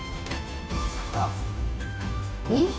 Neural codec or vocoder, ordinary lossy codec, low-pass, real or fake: codec, 16 kHz, 0.5 kbps, FunCodec, trained on Chinese and English, 25 frames a second; none; none; fake